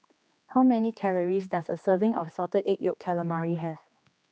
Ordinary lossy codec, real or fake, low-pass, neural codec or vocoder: none; fake; none; codec, 16 kHz, 2 kbps, X-Codec, HuBERT features, trained on general audio